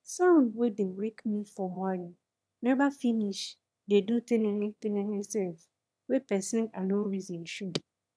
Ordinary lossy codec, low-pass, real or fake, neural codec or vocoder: none; none; fake; autoencoder, 22.05 kHz, a latent of 192 numbers a frame, VITS, trained on one speaker